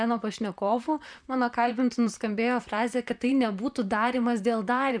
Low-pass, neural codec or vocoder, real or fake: 9.9 kHz; vocoder, 44.1 kHz, 128 mel bands, Pupu-Vocoder; fake